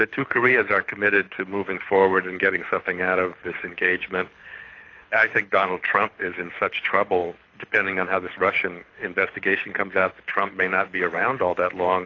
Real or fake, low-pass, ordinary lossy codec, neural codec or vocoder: fake; 7.2 kHz; AAC, 32 kbps; codec, 24 kHz, 6 kbps, HILCodec